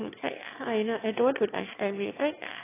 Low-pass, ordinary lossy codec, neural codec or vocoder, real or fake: 3.6 kHz; AAC, 16 kbps; autoencoder, 22.05 kHz, a latent of 192 numbers a frame, VITS, trained on one speaker; fake